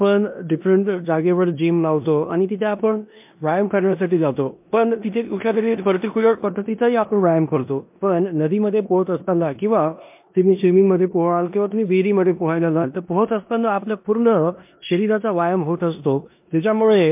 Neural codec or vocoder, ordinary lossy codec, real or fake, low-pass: codec, 16 kHz in and 24 kHz out, 0.9 kbps, LongCat-Audio-Codec, four codebook decoder; MP3, 32 kbps; fake; 3.6 kHz